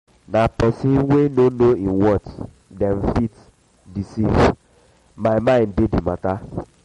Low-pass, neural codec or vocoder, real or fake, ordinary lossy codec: 19.8 kHz; none; real; MP3, 48 kbps